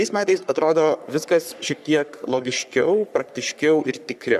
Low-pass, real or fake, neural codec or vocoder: 14.4 kHz; fake; codec, 44.1 kHz, 3.4 kbps, Pupu-Codec